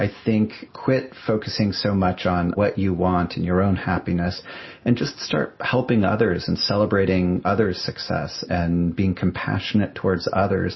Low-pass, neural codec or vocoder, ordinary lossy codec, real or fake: 7.2 kHz; none; MP3, 24 kbps; real